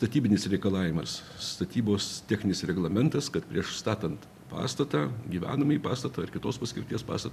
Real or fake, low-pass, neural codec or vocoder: real; 14.4 kHz; none